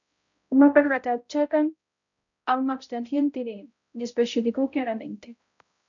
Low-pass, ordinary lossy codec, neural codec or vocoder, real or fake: 7.2 kHz; MP3, 96 kbps; codec, 16 kHz, 0.5 kbps, X-Codec, HuBERT features, trained on balanced general audio; fake